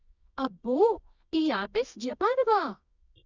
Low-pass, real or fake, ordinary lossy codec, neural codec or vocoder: 7.2 kHz; fake; none; codec, 24 kHz, 0.9 kbps, WavTokenizer, medium music audio release